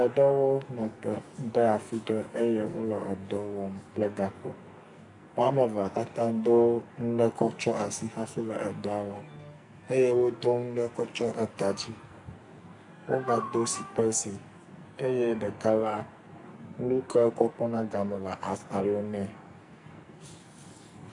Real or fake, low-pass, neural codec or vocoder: fake; 10.8 kHz; codec, 44.1 kHz, 2.6 kbps, SNAC